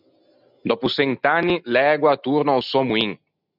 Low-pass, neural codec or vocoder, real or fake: 5.4 kHz; none; real